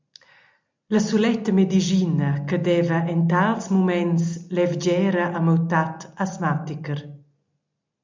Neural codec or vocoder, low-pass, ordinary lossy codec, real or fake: none; 7.2 kHz; MP3, 64 kbps; real